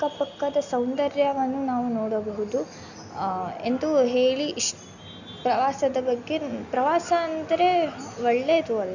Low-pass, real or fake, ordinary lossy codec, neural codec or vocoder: 7.2 kHz; real; none; none